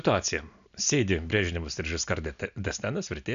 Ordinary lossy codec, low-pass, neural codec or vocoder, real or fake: MP3, 96 kbps; 7.2 kHz; none; real